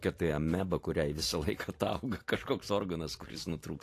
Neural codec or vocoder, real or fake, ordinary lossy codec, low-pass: vocoder, 44.1 kHz, 128 mel bands every 512 samples, BigVGAN v2; fake; AAC, 48 kbps; 14.4 kHz